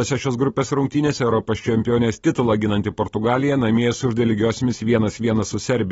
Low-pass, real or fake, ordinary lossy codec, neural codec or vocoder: 9.9 kHz; real; AAC, 24 kbps; none